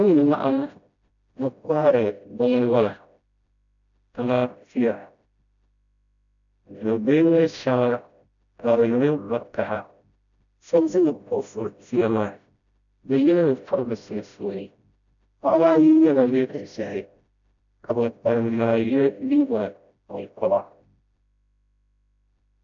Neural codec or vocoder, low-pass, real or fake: codec, 16 kHz, 0.5 kbps, FreqCodec, smaller model; 7.2 kHz; fake